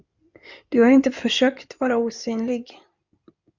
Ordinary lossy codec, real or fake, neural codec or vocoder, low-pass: Opus, 64 kbps; fake; codec, 16 kHz in and 24 kHz out, 2.2 kbps, FireRedTTS-2 codec; 7.2 kHz